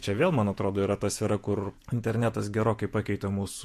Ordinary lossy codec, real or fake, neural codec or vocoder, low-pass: MP3, 64 kbps; fake; vocoder, 48 kHz, 128 mel bands, Vocos; 14.4 kHz